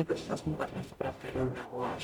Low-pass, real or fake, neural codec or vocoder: 19.8 kHz; fake; codec, 44.1 kHz, 0.9 kbps, DAC